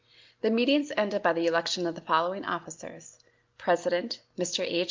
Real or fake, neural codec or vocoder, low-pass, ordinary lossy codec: real; none; 7.2 kHz; Opus, 24 kbps